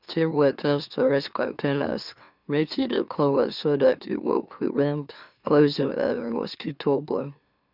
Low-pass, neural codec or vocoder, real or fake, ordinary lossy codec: 5.4 kHz; autoencoder, 44.1 kHz, a latent of 192 numbers a frame, MeloTTS; fake; none